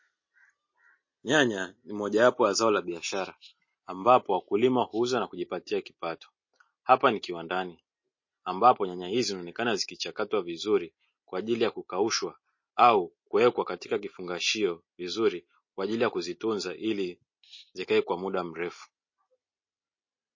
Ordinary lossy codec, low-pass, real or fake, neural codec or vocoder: MP3, 32 kbps; 7.2 kHz; real; none